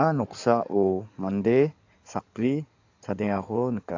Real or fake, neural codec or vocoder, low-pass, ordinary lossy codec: fake; codec, 16 kHz in and 24 kHz out, 2.2 kbps, FireRedTTS-2 codec; 7.2 kHz; AAC, 48 kbps